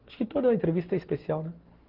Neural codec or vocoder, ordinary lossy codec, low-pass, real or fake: none; Opus, 16 kbps; 5.4 kHz; real